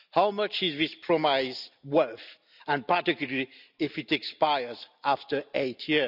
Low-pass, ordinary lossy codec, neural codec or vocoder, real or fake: 5.4 kHz; none; none; real